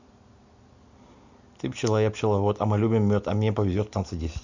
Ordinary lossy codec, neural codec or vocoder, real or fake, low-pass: Opus, 64 kbps; none; real; 7.2 kHz